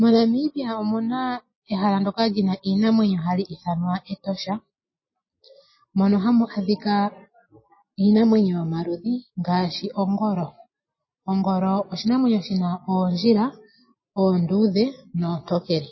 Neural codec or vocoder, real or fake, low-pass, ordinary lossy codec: none; real; 7.2 kHz; MP3, 24 kbps